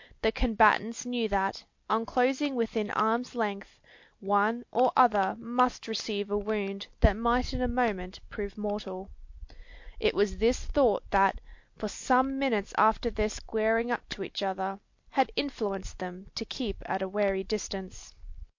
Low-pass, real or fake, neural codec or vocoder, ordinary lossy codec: 7.2 kHz; real; none; MP3, 48 kbps